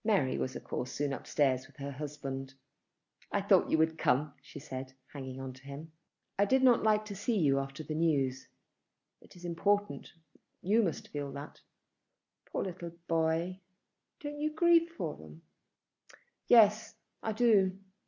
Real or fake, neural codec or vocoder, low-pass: real; none; 7.2 kHz